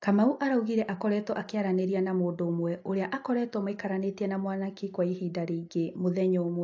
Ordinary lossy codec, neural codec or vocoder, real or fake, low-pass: AAC, 48 kbps; none; real; 7.2 kHz